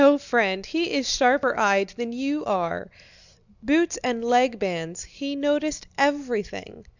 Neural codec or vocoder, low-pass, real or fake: none; 7.2 kHz; real